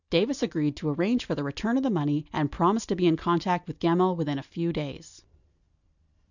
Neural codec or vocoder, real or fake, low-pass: none; real; 7.2 kHz